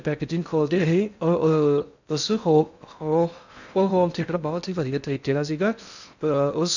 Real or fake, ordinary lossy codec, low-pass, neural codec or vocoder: fake; none; 7.2 kHz; codec, 16 kHz in and 24 kHz out, 0.6 kbps, FocalCodec, streaming, 4096 codes